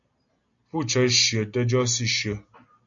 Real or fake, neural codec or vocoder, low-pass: real; none; 7.2 kHz